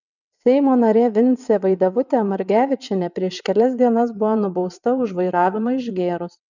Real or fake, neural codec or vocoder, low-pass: fake; vocoder, 22.05 kHz, 80 mel bands, WaveNeXt; 7.2 kHz